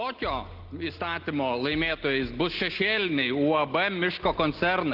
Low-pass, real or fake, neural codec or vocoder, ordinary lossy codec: 5.4 kHz; real; none; Opus, 16 kbps